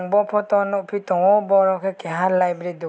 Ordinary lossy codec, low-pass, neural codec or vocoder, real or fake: none; none; none; real